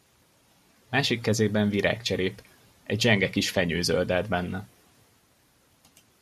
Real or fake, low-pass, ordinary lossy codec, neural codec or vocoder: fake; 14.4 kHz; MP3, 96 kbps; vocoder, 44.1 kHz, 128 mel bands every 512 samples, BigVGAN v2